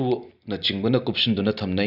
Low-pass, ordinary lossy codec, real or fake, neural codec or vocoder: 5.4 kHz; none; real; none